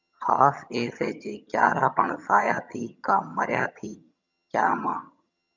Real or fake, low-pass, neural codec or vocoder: fake; 7.2 kHz; vocoder, 22.05 kHz, 80 mel bands, HiFi-GAN